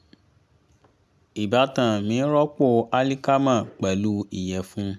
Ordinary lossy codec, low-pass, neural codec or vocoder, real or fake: none; none; none; real